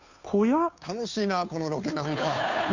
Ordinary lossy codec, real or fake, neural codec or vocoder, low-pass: none; fake; codec, 16 kHz, 2 kbps, FunCodec, trained on Chinese and English, 25 frames a second; 7.2 kHz